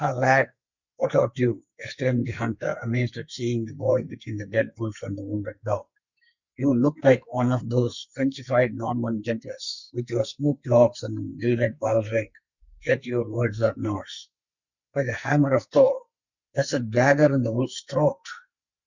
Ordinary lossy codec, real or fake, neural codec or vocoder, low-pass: Opus, 64 kbps; fake; codec, 44.1 kHz, 2.6 kbps, SNAC; 7.2 kHz